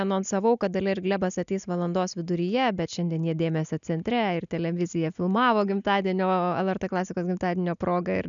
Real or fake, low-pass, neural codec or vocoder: real; 7.2 kHz; none